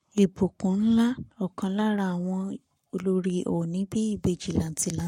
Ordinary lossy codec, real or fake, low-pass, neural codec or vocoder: MP3, 64 kbps; fake; 19.8 kHz; codec, 44.1 kHz, 7.8 kbps, Pupu-Codec